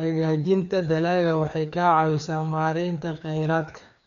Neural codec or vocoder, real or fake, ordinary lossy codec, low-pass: codec, 16 kHz, 2 kbps, FreqCodec, larger model; fake; none; 7.2 kHz